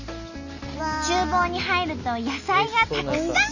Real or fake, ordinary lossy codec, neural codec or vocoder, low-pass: real; none; none; 7.2 kHz